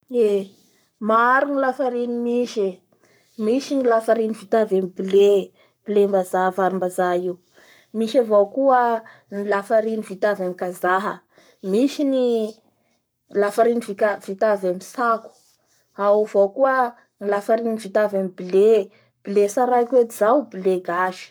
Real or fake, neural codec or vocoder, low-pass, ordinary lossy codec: fake; codec, 44.1 kHz, 7.8 kbps, Pupu-Codec; none; none